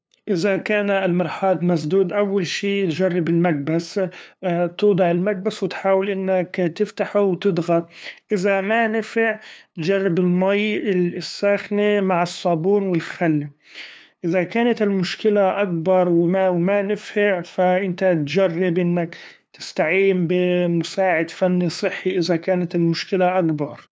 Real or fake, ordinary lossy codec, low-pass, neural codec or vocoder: fake; none; none; codec, 16 kHz, 2 kbps, FunCodec, trained on LibriTTS, 25 frames a second